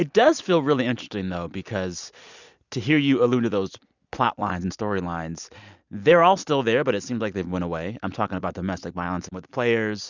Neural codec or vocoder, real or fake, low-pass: none; real; 7.2 kHz